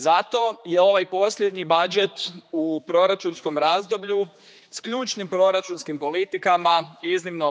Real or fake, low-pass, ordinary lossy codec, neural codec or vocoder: fake; none; none; codec, 16 kHz, 2 kbps, X-Codec, HuBERT features, trained on general audio